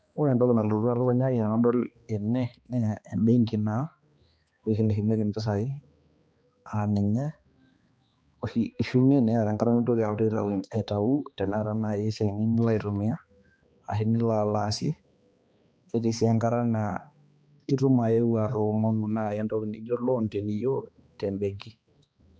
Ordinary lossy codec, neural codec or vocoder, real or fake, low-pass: none; codec, 16 kHz, 2 kbps, X-Codec, HuBERT features, trained on balanced general audio; fake; none